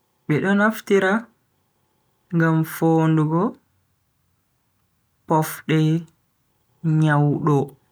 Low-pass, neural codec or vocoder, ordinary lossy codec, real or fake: none; none; none; real